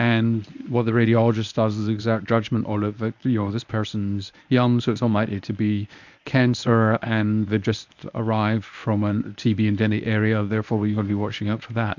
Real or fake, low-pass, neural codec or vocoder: fake; 7.2 kHz; codec, 24 kHz, 0.9 kbps, WavTokenizer, medium speech release version 1